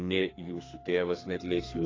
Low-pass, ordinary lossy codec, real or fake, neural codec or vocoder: 7.2 kHz; AAC, 32 kbps; fake; codec, 32 kHz, 1.9 kbps, SNAC